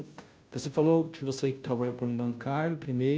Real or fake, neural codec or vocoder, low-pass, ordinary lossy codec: fake; codec, 16 kHz, 0.5 kbps, FunCodec, trained on Chinese and English, 25 frames a second; none; none